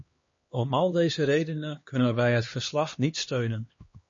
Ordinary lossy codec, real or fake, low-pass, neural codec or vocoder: MP3, 32 kbps; fake; 7.2 kHz; codec, 16 kHz, 2 kbps, X-Codec, HuBERT features, trained on LibriSpeech